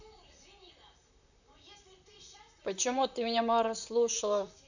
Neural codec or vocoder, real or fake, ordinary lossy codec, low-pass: vocoder, 44.1 kHz, 128 mel bands, Pupu-Vocoder; fake; none; 7.2 kHz